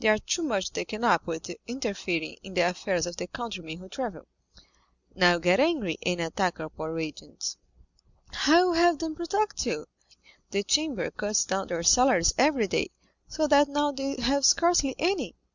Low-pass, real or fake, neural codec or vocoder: 7.2 kHz; real; none